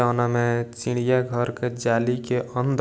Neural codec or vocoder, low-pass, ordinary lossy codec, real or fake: none; none; none; real